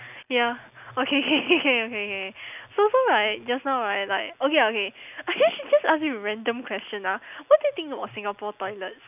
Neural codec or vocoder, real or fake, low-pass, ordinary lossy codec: none; real; 3.6 kHz; none